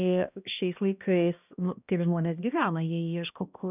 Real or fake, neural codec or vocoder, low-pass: fake; codec, 16 kHz, 1 kbps, X-Codec, HuBERT features, trained on balanced general audio; 3.6 kHz